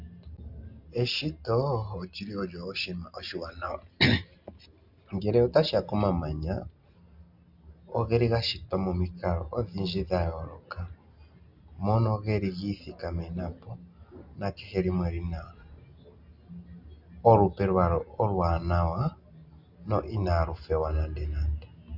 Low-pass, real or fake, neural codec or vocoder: 5.4 kHz; real; none